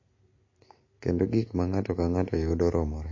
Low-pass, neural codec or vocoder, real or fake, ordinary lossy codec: 7.2 kHz; none; real; MP3, 32 kbps